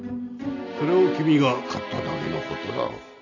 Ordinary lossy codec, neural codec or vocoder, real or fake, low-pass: none; none; real; 7.2 kHz